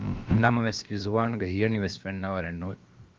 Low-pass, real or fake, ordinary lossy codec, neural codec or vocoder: 7.2 kHz; fake; Opus, 24 kbps; codec, 16 kHz, about 1 kbps, DyCAST, with the encoder's durations